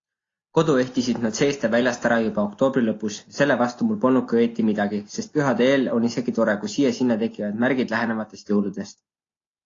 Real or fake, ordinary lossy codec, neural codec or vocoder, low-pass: real; AAC, 32 kbps; none; 7.2 kHz